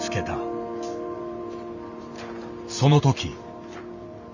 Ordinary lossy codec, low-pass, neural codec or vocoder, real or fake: none; 7.2 kHz; vocoder, 44.1 kHz, 128 mel bands every 512 samples, BigVGAN v2; fake